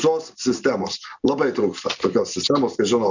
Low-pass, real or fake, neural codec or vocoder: 7.2 kHz; real; none